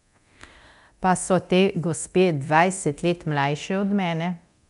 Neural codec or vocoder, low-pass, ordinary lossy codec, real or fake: codec, 24 kHz, 0.9 kbps, DualCodec; 10.8 kHz; none; fake